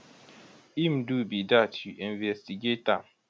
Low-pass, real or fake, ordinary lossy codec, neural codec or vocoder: none; real; none; none